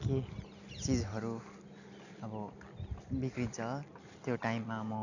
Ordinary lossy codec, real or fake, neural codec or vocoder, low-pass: none; real; none; 7.2 kHz